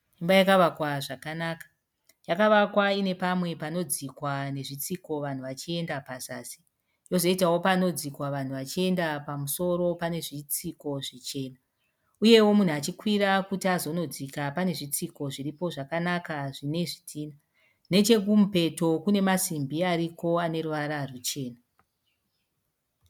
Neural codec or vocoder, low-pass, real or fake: none; 19.8 kHz; real